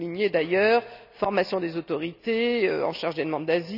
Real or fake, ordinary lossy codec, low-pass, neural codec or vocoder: real; none; 5.4 kHz; none